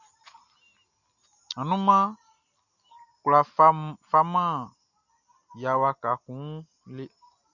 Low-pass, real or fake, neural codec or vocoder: 7.2 kHz; real; none